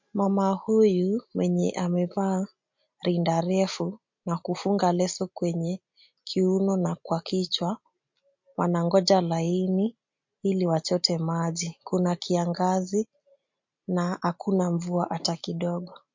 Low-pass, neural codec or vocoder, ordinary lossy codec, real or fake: 7.2 kHz; none; MP3, 48 kbps; real